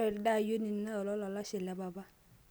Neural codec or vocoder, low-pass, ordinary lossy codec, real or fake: none; none; none; real